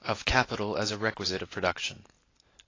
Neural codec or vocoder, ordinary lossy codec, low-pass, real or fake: none; AAC, 32 kbps; 7.2 kHz; real